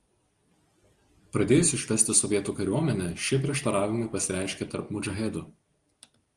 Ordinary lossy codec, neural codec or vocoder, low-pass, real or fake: Opus, 24 kbps; none; 10.8 kHz; real